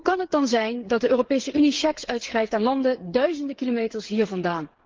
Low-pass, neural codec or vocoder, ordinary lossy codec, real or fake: 7.2 kHz; codec, 16 kHz, 8 kbps, FreqCodec, smaller model; Opus, 16 kbps; fake